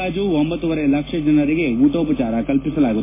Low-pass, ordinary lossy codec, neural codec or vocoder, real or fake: 3.6 kHz; MP3, 16 kbps; none; real